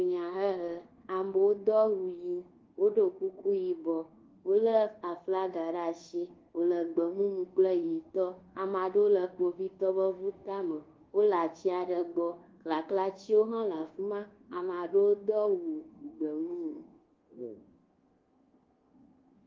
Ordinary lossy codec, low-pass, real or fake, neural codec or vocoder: Opus, 16 kbps; 7.2 kHz; fake; codec, 24 kHz, 1.2 kbps, DualCodec